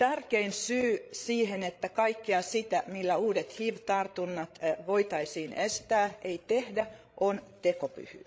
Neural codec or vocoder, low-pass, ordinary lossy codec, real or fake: codec, 16 kHz, 16 kbps, FreqCodec, larger model; none; none; fake